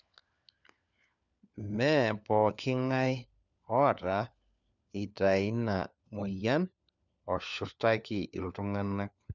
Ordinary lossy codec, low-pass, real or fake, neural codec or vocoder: none; 7.2 kHz; fake; codec, 16 kHz, 4 kbps, FunCodec, trained on LibriTTS, 50 frames a second